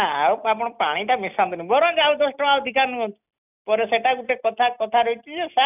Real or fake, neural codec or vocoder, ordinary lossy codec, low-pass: real; none; none; 3.6 kHz